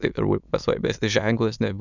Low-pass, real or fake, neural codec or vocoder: 7.2 kHz; fake; autoencoder, 22.05 kHz, a latent of 192 numbers a frame, VITS, trained on many speakers